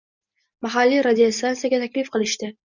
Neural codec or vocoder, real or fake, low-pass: none; real; 7.2 kHz